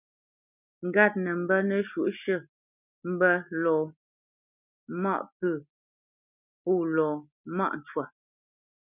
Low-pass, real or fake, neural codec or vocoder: 3.6 kHz; real; none